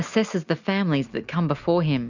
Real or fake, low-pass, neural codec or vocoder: real; 7.2 kHz; none